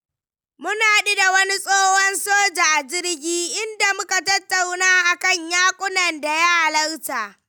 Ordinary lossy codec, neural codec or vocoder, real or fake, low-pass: none; none; real; none